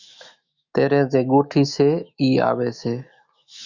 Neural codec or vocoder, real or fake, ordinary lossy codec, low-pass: autoencoder, 48 kHz, 128 numbers a frame, DAC-VAE, trained on Japanese speech; fake; Opus, 64 kbps; 7.2 kHz